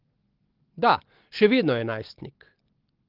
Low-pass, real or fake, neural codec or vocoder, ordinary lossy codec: 5.4 kHz; real; none; Opus, 16 kbps